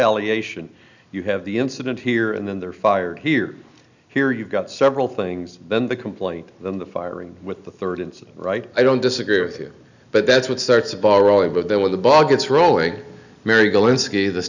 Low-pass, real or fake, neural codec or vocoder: 7.2 kHz; real; none